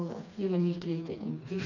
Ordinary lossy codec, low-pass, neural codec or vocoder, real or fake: none; 7.2 kHz; codec, 16 kHz, 2 kbps, FreqCodec, smaller model; fake